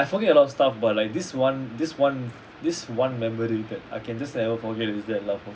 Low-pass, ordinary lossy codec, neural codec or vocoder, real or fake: none; none; none; real